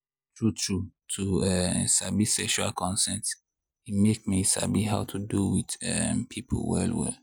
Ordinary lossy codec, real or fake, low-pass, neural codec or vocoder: none; real; none; none